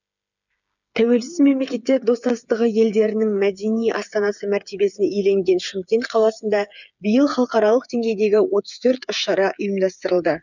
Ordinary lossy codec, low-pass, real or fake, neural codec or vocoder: none; 7.2 kHz; fake; codec, 16 kHz, 8 kbps, FreqCodec, smaller model